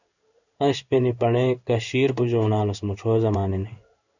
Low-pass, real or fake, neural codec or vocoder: 7.2 kHz; fake; codec, 16 kHz in and 24 kHz out, 1 kbps, XY-Tokenizer